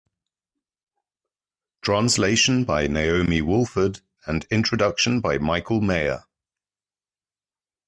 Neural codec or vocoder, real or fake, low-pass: none; real; 9.9 kHz